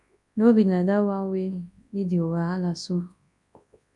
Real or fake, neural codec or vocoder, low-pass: fake; codec, 24 kHz, 0.9 kbps, WavTokenizer, large speech release; 10.8 kHz